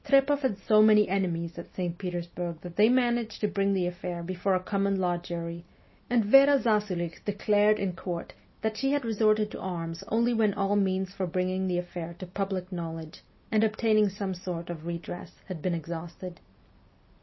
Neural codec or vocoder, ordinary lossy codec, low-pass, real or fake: none; MP3, 24 kbps; 7.2 kHz; real